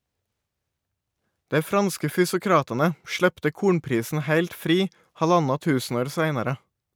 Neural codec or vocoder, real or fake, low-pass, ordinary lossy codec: none; real; none; none